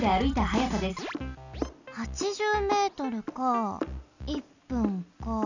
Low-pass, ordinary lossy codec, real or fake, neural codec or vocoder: 7.2 kHz; none; real; none